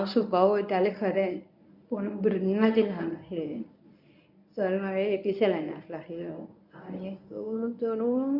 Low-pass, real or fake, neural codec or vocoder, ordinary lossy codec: 5.4 kHz; fake; codec, 24 kHz, 0.9 kbps, WavTokenizer, medium speech release version 1; none